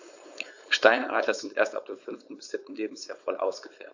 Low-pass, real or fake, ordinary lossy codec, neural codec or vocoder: 7.2 kHz; fake; AAC, 48 kbps; codec, 16 kHz, 4.8 kbps, FACodec